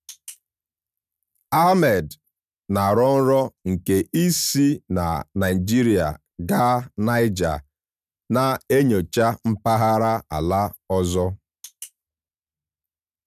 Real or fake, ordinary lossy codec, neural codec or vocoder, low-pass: real; none; none; 14.4 kHz